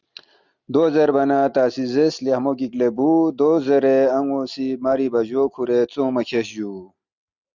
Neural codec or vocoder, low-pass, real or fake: none; 7.2 kHz; real